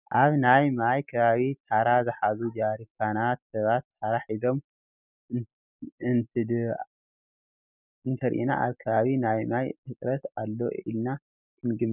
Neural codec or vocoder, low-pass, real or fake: none; 3.6 kHz; real